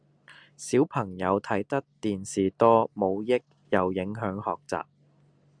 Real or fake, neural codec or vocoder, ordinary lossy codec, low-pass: real; none; Opus, 64 kbps; 9.9 kHz